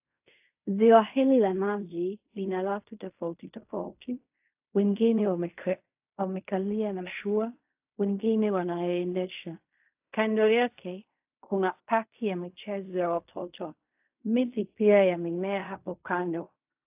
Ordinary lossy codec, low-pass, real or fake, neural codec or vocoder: AAC, 32 kbps; 3.6 kHz; fake; codec, 16 kHz in and 24 kHz out, 0.4 kbps, LongCat-Audio-Codec, fine tuned four codebook decoder